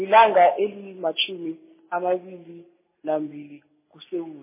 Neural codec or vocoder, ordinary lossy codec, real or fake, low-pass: none; MP3, 16 kbps; real; 3.6 kHz